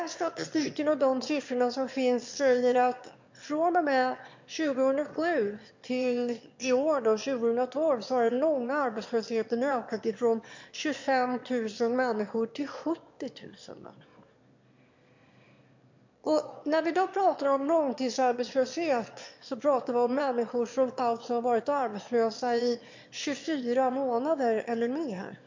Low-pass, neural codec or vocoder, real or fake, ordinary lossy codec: 7.2 kHz; autoencoder, 22.05 kHz, a latent of 192 numbers a frame, VITS, trained on one speaker; fake; MP3, 48 kbps